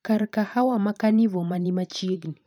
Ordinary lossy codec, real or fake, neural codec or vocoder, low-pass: none; fake; vocoder, 44.1 kHz, 128 mel bands every 512 samples, BigVGAN v2; 19.8 kHz